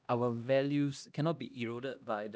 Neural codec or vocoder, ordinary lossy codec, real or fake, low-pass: codec, 16 kHz, 1 kbps, X-Codec, HuBERT features, trained on LibriSpeech; none; fake; none